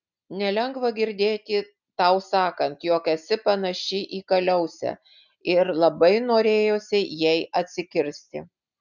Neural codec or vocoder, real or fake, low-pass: none; real; 7.2 kHz